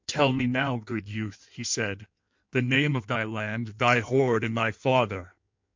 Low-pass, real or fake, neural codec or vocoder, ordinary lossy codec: 7.2 kHz; fake; codec, 16 kHz in and 24 kHz out, 1.1 kbps, FireRedTTS-2 codec; MP3, 64 kbps